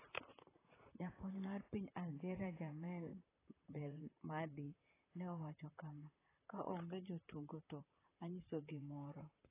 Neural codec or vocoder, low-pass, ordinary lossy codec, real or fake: vocoder, 44.1 kHz, 128 mel bands, Pupu-Vocoder; 3.6 kHz; AAC, 16 kbps; fake